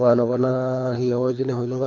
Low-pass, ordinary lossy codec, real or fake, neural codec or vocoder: 7.2 kHz; MP3, 48 kbps; fake; codec, 24 kHz, 3 kbps, HILCodec